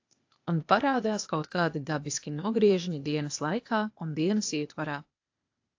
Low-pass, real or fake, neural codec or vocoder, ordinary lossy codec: 7.2 kHz; fake; codec, 16 kHz, 0.8 kbps, ZipCodec; AAC, 48 kbps